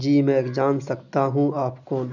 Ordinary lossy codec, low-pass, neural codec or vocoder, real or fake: none; 7.2 kHz; none; real